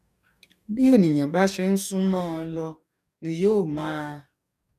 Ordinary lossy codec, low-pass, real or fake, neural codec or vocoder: none; 14.4 kHz; fake; codec, 44.1 kHz, 2.6 kbps, DAC